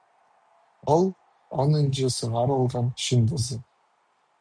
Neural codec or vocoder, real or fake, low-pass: none; real; 9.9 kHz